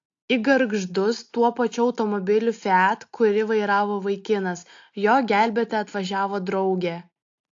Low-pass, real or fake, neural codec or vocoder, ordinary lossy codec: 7.2 kHz; real; none; AAC, 48 kbps